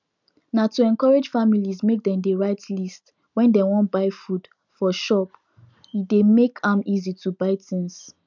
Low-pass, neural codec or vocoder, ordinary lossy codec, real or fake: 7.2 kHz; none; none; real